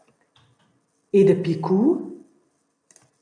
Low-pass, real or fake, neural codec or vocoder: 9.9 kHz; real; none